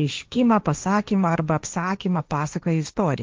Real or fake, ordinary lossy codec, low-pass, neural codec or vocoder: fake; Opus, 24 kbps; 7.2 kHz; codec, 16 kHz, 1.1 kbps, Voila-Tokenizer